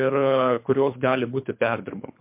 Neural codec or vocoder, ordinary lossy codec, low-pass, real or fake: codec, 24 kHz, 3 kbps, HILCodec; MP3, 32 kbps; 3.6 kHz; fake